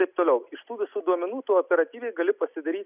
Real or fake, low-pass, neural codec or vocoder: real; 3.6 kHz; none